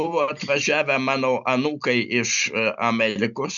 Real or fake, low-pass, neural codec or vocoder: real; 7.2 kHz; none